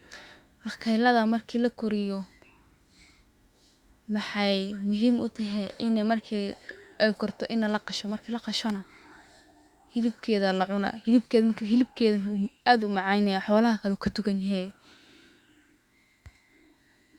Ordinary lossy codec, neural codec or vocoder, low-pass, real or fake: Opus, 64 kbps; autoencoder, 48 kHz, 32 numbers a frame, DAC-VAE, trained on Japanese speech; 19.8 kHz; fake